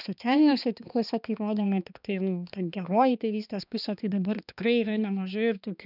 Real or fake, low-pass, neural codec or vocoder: fake; 5.4 kHz; codec, 16 kHz, 2 kbps, X-Codec, HuBERT features, trained on balanced general audio